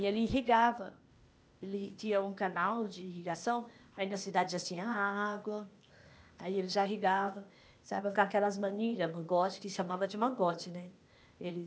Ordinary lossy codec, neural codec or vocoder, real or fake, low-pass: none; codec, 16 kHz, 0.8 kbps, ZipCodec; fake; none